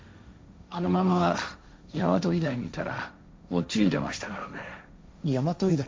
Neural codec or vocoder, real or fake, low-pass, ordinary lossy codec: codec, 16 kHz, 1.1 kbps, Voila-Tokenizer; fake; none; none